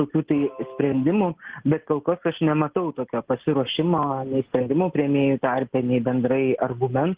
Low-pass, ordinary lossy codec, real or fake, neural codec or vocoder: 3.6 kHz; Opus, 16 kbps; real; none